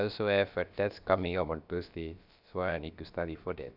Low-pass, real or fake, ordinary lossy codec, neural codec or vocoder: 5.4 kHz; fake; none; codec, 16 kHz, about 1 kbps, DyCAST, with the encoder's durations